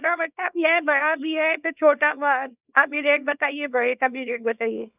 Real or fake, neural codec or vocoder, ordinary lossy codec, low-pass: fake; codec, 24 kHz, 0.9 kbps, WavTokenizer, small release; none; 3.6 kHz